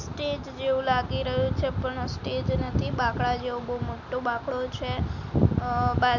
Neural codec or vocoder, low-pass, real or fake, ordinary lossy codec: none; 7.2 kHz; real; none